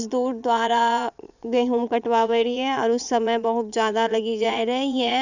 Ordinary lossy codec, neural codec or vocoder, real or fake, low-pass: none; vocoder, 22.05 kHz, 80 mel bands, Vocos; fake; 7.2 kHz